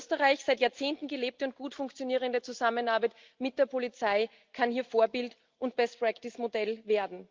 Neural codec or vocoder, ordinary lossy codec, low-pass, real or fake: none; Opus, 32 kbps; 7.2 kHz; real